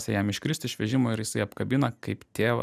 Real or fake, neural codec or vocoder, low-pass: real; none; 14.4 kHz